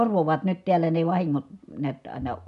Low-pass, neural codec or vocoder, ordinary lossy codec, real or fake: 10.8 kHz; none; none; real